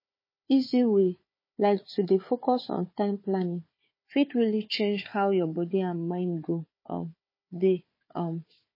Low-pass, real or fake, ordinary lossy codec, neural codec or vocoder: 5.4 kHz; fake; MP3, 24 kbps; codec, 16 kHz, 4 kbps, FunCodec, trained on Chinese and English, 50 frames a second